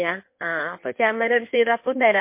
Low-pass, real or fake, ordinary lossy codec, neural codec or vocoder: 3.6 kHz; fake; MP3, 24 kbps; codec, 16 kHz in and 24 kHz out, 1.1 kbps, FireRedTTS-2 codec